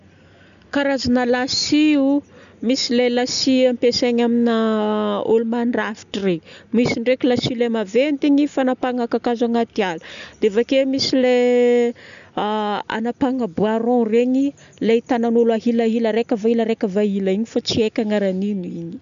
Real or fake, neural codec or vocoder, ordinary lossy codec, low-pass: real; none; none; 7.2 kHz